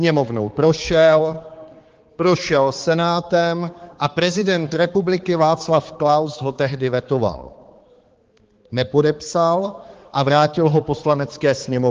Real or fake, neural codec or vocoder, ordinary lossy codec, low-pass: fake; codec, 16 kHz, 4 kbps, X-Codec, HuBERT features, trained on balanced general audio; Opus, 16 kbps; 7.2 kHz